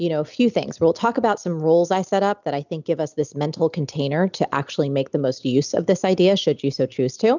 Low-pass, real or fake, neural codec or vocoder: 7.2 kHz; real; none